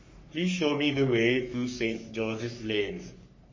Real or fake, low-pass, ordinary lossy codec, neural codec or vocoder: fake; 7.2 kHz; MP3, 32 kbps; codec, 44.1 kHz, 3.4 kbps, Pupu-Codec